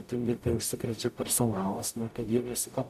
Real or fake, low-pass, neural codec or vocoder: fake; 14.4 kHz; codec, 44.1 kHz, 0.9 kbps, DAC